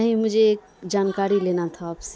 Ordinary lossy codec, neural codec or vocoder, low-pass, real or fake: none; none; none; real